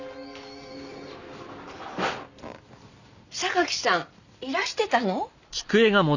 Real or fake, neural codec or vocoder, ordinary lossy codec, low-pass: real; none; none; 7.2 kHz